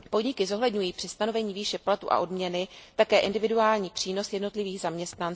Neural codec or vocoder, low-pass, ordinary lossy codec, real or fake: none; none; none; real